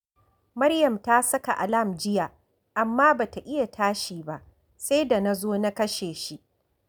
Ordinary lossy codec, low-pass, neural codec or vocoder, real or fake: none; none; none; real